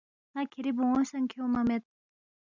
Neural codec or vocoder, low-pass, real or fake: none; 7.2 kHz; real